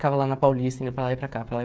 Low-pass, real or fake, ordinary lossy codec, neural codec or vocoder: none; fake; none; codec, 16 kHz, 16 kbps, FreqCodec, smaller model